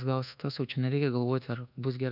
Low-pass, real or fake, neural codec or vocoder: 5.4 kHz; fake; codec, 24 kHz, 1.2 kbps, DualCodec